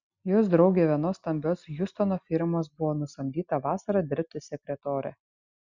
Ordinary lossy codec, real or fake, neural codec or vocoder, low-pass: Opus, 64 kbps; real; none; 7.2 kHz